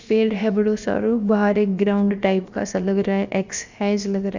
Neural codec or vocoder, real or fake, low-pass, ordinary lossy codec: codec, 16 kHz, about 1 kbps, DyCAST, with the encoder's durations; fake; 7.2 kHz; Opus, 64 kbps